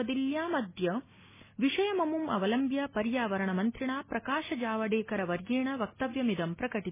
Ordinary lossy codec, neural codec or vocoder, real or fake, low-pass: MP3, 16 kbps; none; real; 3.6 kHz